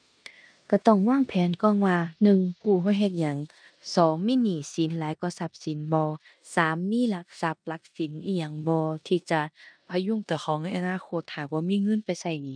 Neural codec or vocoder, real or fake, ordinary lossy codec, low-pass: codec, 16 kHz in and 24 kHz out, 0.9 kbps, LongCat-Audio-Codec, four codebook decoder; fake; none; 9.9 kHz